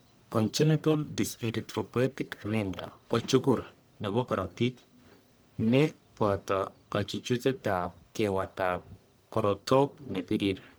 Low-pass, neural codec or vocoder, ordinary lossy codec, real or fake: none; codec, 44.1 kHz, 1.7 kbps, Pupu-Codec; none; fake